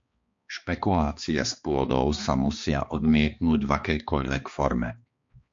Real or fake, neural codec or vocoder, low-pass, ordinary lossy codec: fake; codec, 16 kHz, 2 kbps, X-Codec, HuBERT features, trained on balanced general audio; 7.2 kHz; MP3, 48 kbps